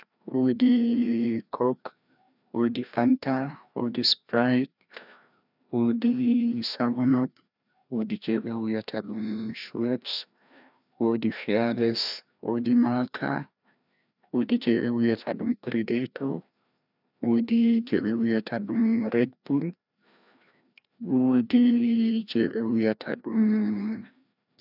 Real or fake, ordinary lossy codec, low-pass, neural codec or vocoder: fake; none; 5.4 kHz; codec, 16 kHz, 1 kbps, FreqCodec, larger model